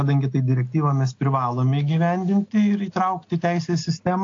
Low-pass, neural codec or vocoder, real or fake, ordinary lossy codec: 7.2 kHz; none; real; AAC, 48 kbps